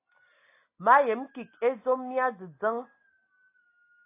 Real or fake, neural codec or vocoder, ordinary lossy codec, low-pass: real; none; AAC, 24 kbps; 3.6 kHz